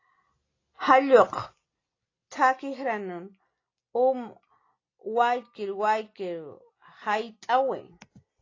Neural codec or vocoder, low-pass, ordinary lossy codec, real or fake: none; 7.2 kHz; AAC, 32 kbps; real